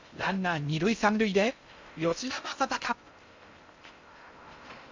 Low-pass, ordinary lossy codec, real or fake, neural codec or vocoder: 7.2 kHz; MP3, 64 kbps; fake; codec, 16 kHz in and 24 kHz out, 0.6 kbps, FocalCodec, streaming, 2048 codes